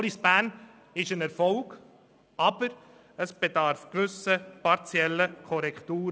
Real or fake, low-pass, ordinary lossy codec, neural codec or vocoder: real; none; none; none